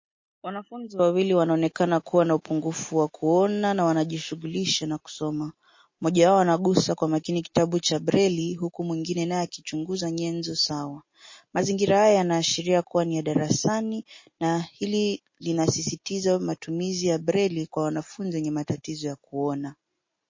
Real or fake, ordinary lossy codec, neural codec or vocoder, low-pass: real; MP3, 32 kbps; none; 7.2 kHz